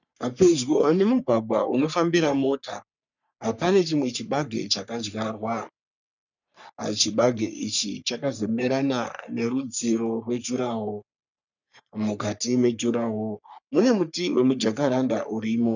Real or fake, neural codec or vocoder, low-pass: fake; codec, 44.1 kHz, 3.4 kbps, Pupu-Codec; 7.2 kHz